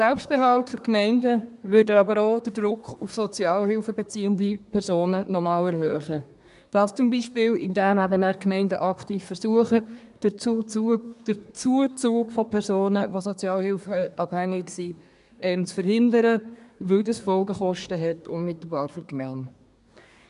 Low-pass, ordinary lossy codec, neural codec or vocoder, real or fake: 10.8 kHz; none; codec, 24 kHz, 1 kbps, SNAC; fake